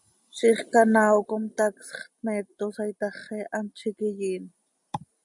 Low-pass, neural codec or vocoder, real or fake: 10.8 kHz; none; real